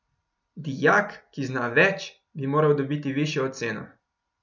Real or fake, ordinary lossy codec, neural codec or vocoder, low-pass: real; none; none; 7.2 kHz